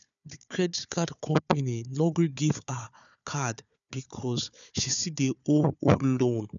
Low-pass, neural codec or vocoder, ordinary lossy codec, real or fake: 7.2 kHz; codec, 16 kHz, 4 kbps, FunCodec, trained on Chinese and English, 50 frames a second; none; fake